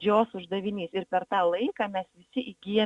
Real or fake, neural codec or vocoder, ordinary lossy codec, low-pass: real; none; Opus, 64 kbps; 10.8 kHz